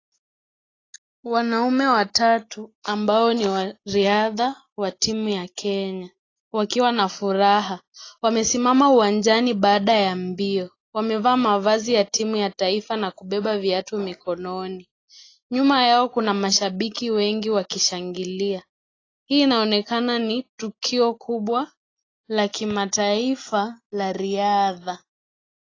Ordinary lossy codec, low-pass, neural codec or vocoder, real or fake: AAC, 32 kbps; 7.2 kHz; none; real